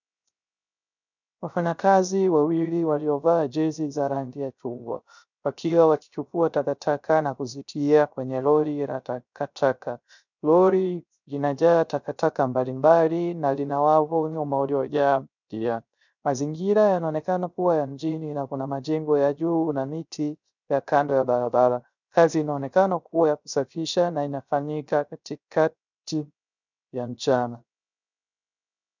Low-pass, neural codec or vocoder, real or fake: 7.2 kHz; codec, 16 kHz, 0.3 kbps, FocalCodec; fake